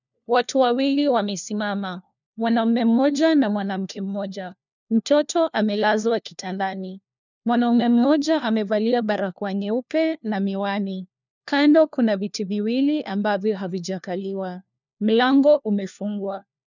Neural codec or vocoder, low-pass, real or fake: codec, 16 kHz, 1 kbps, FunCodec, trained on LibriTTS, 50 frames a second; 7.2 kHz; fake